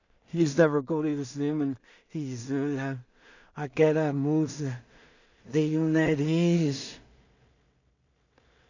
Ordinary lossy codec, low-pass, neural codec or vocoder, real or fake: none; 7.2 kHz; codec, 16 kHz in and 24 kHz out, 0.4 kbps, LongCat-Audio-Codec, two codebook decoder; fake